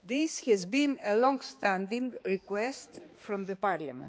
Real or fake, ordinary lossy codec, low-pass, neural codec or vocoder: fake; none; none; codec, 16 kHz, 2 kbps, X-Codec, HuBERT features, trained on balanced general audio